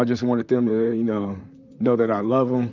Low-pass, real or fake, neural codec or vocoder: 7.2 kHz; fake; vocoder, 44.1 kHz, 128 mel bands, Pupu-Vocoder